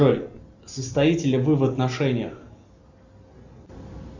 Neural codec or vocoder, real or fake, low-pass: none; real; 7.2 kHz